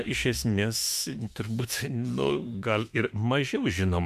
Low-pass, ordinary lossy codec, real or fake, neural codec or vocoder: 14.4 kHz; MP3, 96 kbps; fake; autoencoder, 48 kHz, 32 numbers a frame, DAC-VAE, trained on Japanese speech